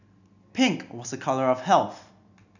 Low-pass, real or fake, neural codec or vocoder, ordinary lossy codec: 7.2 kHz; real; none; none